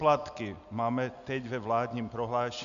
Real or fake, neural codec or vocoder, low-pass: real; none; 7.2 kHz